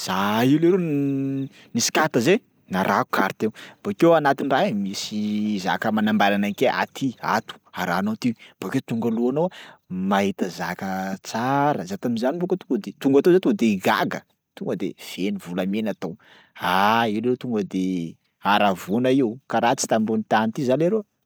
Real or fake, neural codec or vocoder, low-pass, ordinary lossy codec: real; none; none; none